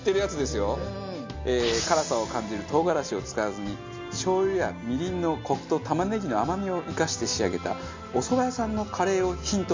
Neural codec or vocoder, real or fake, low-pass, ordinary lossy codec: none; real; 7.2 kHz; MP3, 64 kbps